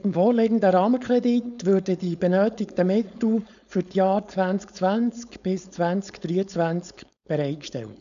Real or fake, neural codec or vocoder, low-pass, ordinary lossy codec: fake; codec, 16 kHz, 4.8 kbps, FACodec; 7.2 kHz; none